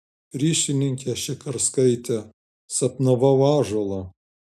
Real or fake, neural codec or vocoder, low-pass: real; none; 14.4 kHz